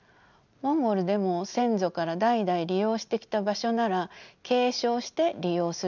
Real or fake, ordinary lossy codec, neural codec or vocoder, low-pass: real; none; none; 7.2 kHz